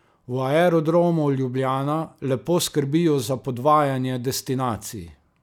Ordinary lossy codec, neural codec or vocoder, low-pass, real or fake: none; none; 19.8 kHz; real